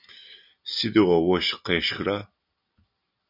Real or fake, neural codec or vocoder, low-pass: real; none; 5.4 kHz